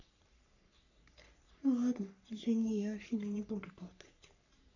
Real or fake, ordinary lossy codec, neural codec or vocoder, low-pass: fake; none; codec, 44.1 kHz, 3.4 kbps, Pupu-Codec; 7.2 kHz